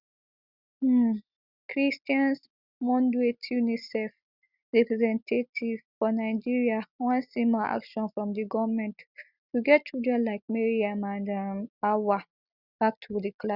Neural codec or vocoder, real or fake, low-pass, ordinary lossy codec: none; real; 5.4 kHz; Opus, 64 kbps